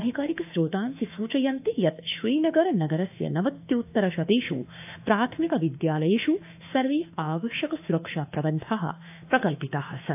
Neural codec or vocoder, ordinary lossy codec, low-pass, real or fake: autoencoder, 48 kHz, 32 numbers a frame, DAC-VAE, trained on Japanese speech; AAC, 32 kbps; 3.6 kHz; fake